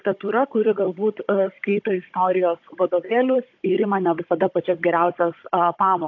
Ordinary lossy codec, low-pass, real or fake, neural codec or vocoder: AAC, 48 kbps; 7.2 kHz; fake; codec, 16 kHz, 16 kbps, FunCodec, trained on Chinese and English, 50 frames a second